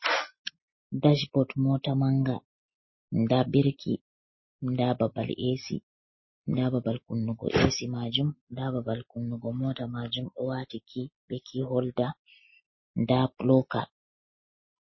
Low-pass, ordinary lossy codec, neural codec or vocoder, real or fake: 7.2 kHz; MP3, 24 kbps; none; real